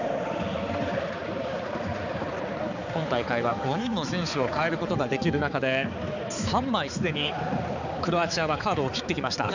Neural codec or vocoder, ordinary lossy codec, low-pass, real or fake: codec, 16 kHz, 4 kbps, X-Codec, HuBERT features, trained on balanced general audio; none; 7.2 kHz; fake